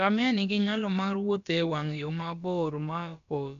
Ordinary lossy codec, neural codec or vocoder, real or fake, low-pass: none; codec, 16 kHz, about 1 kbps, DyCAST, with the encoder's durations; fake; 7.2 kHz